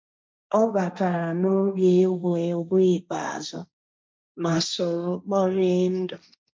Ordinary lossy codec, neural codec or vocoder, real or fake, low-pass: none; codec, 16 kHz, 1.1 kbps, Voila-Tokenizer; fake; none